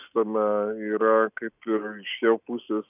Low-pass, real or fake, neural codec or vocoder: 3.6 kHz; real; none